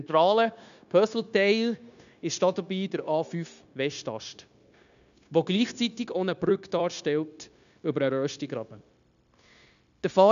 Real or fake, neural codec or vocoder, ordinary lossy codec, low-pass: fake; codec, 16 kHz, 0.9 kbps, LongCat-Audio-Codec; none; 7.2 kHz